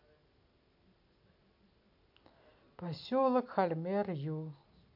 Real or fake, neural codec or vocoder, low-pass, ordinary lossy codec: real; none; 5.4 kHz; none